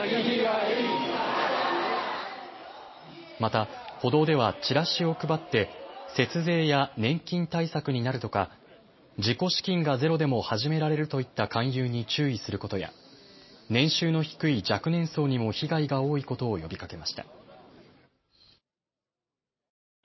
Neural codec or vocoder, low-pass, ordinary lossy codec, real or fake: none; 7.2 kHz; MP3, 24 kbps; real